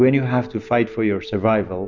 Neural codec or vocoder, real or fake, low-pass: none; real; 7.2 kHz